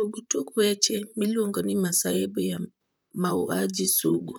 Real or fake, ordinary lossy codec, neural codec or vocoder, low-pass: fake; none; vocoder, 44.1 kHz, 128 mel bands, Pupu-Vocoder; none